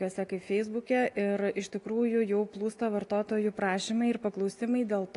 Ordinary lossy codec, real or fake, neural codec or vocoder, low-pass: AAC, 48 kbps; real; none; 10.8 kHz